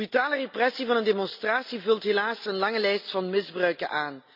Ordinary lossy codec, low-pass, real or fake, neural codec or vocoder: none; 5.4 kHz; real; none